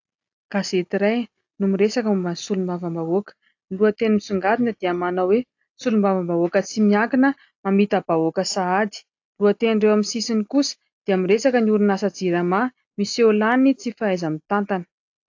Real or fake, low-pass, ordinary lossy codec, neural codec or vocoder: real; 7.2 kHz; AAC, 48 kbps; none